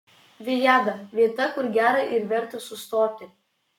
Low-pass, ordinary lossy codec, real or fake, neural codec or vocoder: 19.8 kHz; MP3, 96 kbps; fake; codec, 44.1 kHz, 7.8 kbps, Pupu-Codec